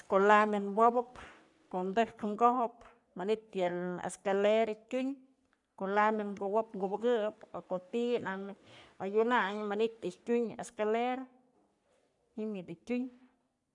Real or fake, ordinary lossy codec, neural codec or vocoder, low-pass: fake; none; codec, 44.1 kHz, 3.4 kbps, Pupu-Codec; 10.8 kHz